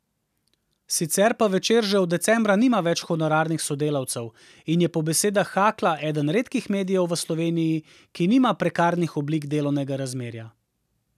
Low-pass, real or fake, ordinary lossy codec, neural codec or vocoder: 14.4 kHz; real; none; none